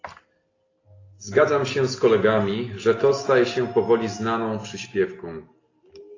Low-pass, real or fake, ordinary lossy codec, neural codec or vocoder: 7.2 kHz; real; AAC, 32 kbps; none